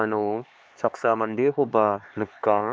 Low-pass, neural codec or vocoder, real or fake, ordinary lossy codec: none; codec, 16 kHz, 2 kbps, X-Codec, HuBERT features, trained on LibriSpeech; fake; none